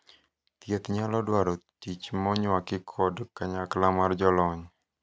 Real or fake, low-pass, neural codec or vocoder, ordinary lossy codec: real; none; none; none